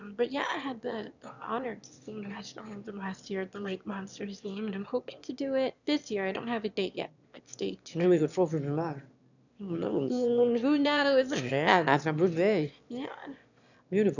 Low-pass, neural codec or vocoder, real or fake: 7.2 kHz; autoencoder, 22.05 kHz, a latent of 192 numbers a frame, VITS, trained on one speaker; fake